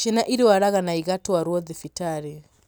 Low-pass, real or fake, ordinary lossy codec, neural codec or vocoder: none; real; none; none